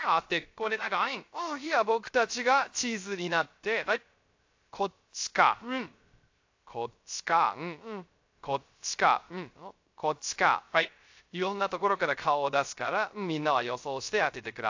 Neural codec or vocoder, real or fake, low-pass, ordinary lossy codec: codec, 16 kHz, 0.3 kbps, FocalCodec; fake; 7.2 kHz; AAC, 48 kbps